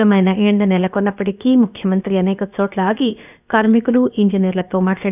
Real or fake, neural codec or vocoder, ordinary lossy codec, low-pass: fake; codec, 16 kHz, about 1 kbps, DyCAST, with the encoder's durations; none; 3.6 kHz